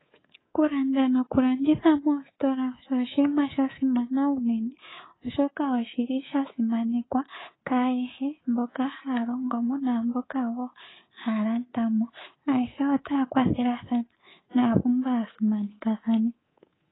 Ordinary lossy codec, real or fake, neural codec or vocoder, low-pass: AAC, 16 kbps; fake; codec, 24 kHz, 3.1 kbps, DualCodec; 7.2 kHz